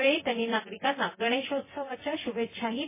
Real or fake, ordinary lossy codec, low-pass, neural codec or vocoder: fake; MP3, 16 kbps; 3.6 kHz; vocoder, 24 kHz, 100 mel bands, Vocos